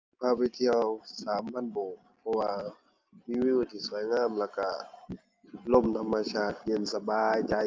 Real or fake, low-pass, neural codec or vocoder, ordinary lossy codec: real; 7.2 kHz; none; Opus, 32 kbps